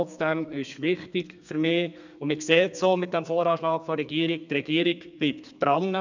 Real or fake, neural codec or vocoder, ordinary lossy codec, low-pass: fake; codec, 32 kHz, 1.9 kbps, SNAC; none; 7.2 kHz